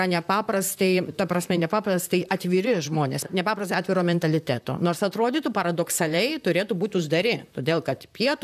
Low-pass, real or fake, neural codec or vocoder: 14.4 kHz; fake; vocoder, 44.1 kHz, 128 mel bands, Pupu-Vocoder